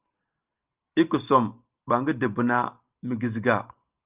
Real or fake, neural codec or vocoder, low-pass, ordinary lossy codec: real; none; 3.6 kHz; Opus, 24 kbps